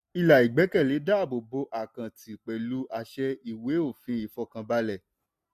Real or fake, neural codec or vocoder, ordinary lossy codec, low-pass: real; none; none; 14.4 kHz